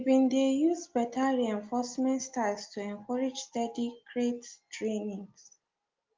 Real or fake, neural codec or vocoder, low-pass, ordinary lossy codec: real; none; 7.2 kHz; Opus, 24 kbps